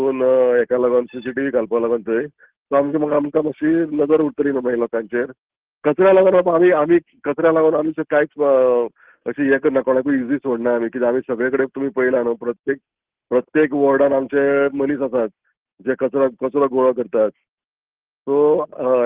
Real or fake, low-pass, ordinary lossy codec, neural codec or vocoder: real; 3.6 kHz; Opus, 16 kbps; none